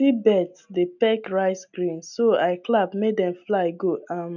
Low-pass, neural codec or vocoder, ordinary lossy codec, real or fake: 7.2 kHz; none; none; real